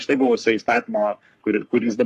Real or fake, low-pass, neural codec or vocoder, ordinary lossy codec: fake; 14.4 kHz; codec, 44.1 kHz, 3.4 kbps, Pupu-Codec; AAC, 64 kbps